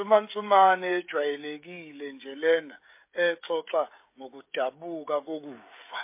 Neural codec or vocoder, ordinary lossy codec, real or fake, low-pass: codec, 16 kHz, 16 kbps, FreqCodec, smaller model; MP3, 24 kbps; fake; 3.6 kHz